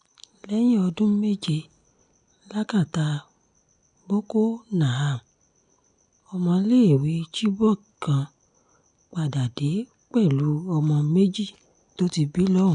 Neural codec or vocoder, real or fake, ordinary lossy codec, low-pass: none; real; none; 9.9 kHz